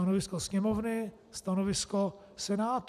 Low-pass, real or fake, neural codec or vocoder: 14.4 kHz; real; none